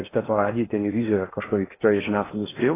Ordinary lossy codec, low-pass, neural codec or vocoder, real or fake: AAC, 16 kbps; 3.6 kHz; codec, 16 kHz in and 24 kHz out, 0.8 kbps, FocalCodec, streaming, 65536 codes; fake